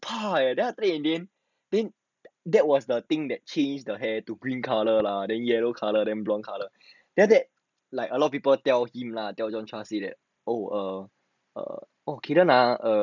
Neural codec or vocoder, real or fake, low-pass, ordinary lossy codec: none; real; 7.2 kHz; none